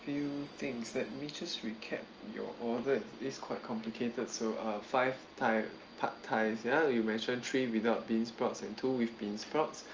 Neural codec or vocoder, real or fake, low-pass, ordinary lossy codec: none; real; 7.2 kHz; Opus, 24 kbps